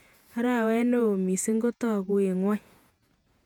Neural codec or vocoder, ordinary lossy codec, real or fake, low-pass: vocoder, 48 kHz, 128 mel bands, Vocos; MP3, 96 kbps; fake; 19.8 kHz